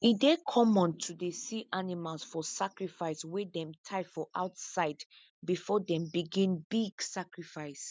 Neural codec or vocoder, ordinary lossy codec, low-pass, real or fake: none; none; none; real